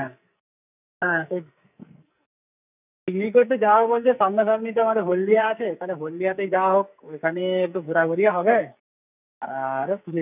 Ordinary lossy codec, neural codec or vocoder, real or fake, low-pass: none; codec, 44.1 kHz, 2.6 kbps, SNAC; fake; 3.6 kHz